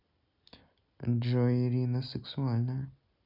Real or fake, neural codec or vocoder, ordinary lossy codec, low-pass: real; none; none; 5.4 kHz